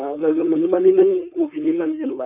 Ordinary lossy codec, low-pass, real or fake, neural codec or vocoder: none; 3.6 kHz; fake; codec, 16 kHz, 4.8 kbps, FACodec